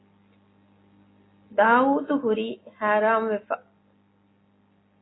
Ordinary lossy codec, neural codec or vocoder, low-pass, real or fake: AAC, 16 kbps; none; 7.2 kHz; real